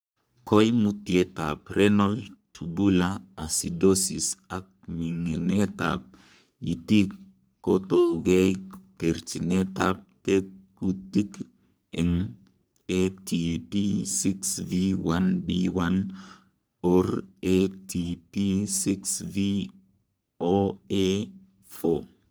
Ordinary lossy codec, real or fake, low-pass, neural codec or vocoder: none; fake; none; codec, 44.1 kHz, 3.4 kbps, Pupu-Codec